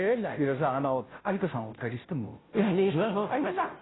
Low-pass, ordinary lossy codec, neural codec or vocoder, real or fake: 7.2 kHz; AAC, 16 kbps; codec, 16 kHz, 0.5 kbps, FunCodec, trained on Chinese and English, 25 frames a second; fake